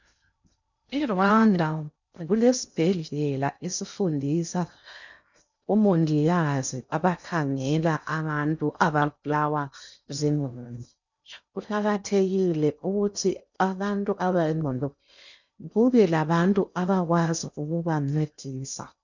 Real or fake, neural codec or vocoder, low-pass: fake; codec, 16 kHz in and 24 kHz out, 0.6 kbps, FocalCodec, streaming, 2048 codes; 7.2 kHz